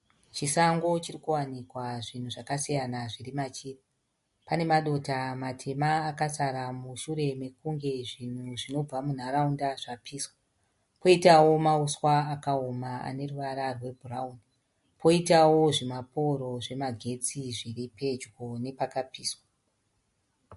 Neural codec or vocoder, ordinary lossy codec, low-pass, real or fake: none; MP3, 48 kbps; 14.4 kHz; real